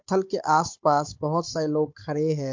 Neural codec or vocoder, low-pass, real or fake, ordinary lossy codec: codec, 16 kHz, 16 kbps, FunCodec, trained on Chinese and English, 50 frames a second; 7.2 kHz; fake; MP3, 48 kbps